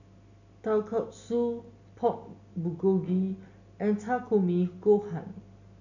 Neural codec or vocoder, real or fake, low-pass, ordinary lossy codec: none; real; 7.2 kHz; none